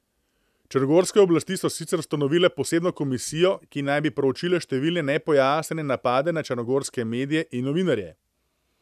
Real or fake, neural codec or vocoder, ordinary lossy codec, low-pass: real; none; none; 14.4 kHz